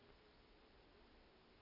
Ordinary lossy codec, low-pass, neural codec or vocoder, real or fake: none; 5.4 kHz; none; real